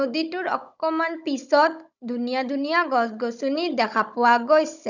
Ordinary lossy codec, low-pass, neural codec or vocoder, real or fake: none; 7.2 kHz; codec, 16 kHz, 16 kbps, FunCodec, trained on Chinese and English, 50 frames a second; fake